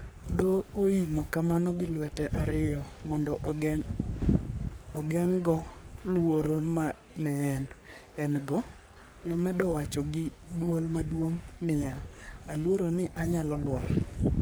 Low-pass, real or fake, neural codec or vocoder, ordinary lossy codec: none; fake; codec, 44.1 kHz, 3.4 kbps, Pupu-Codec; none